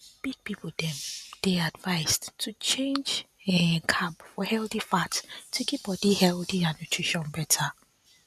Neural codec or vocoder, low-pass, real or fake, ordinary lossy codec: none; 14.4 kHz; real; none